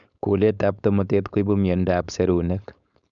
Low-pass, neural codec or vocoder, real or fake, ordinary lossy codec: 7.2 kHz; codec, 16 kHz, 4.8 kbps, FACodec; fake; none